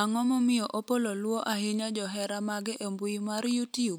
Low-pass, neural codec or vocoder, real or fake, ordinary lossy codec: none; none; real; none